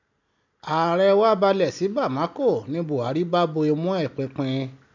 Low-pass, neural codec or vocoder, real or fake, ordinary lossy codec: 7.2 kHz; none; real; none